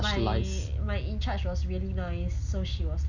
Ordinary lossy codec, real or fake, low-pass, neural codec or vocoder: none; real; 7.2 kHz; none